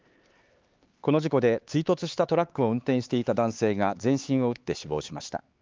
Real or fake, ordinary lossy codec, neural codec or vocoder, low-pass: fake; Opus, 32 kbps; codec, 16 kHz, 4 kbps, X-Codec, HuBERT features, trained on LibriSpeech; 7.2 kHz